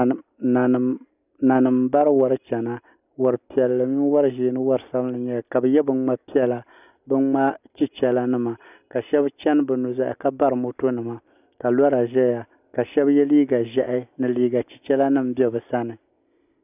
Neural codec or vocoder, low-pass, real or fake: none; 3.6 kHz; real